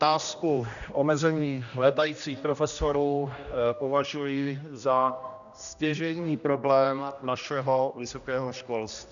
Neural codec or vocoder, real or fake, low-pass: codec, 16 kHz, 1 kbps, X-Codec, HuBERT features, trained on general audio; fake; 7.2 kHz